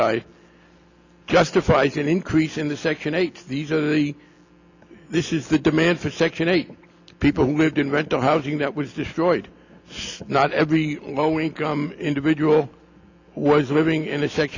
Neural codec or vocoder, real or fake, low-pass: none; real; 7.2 kHz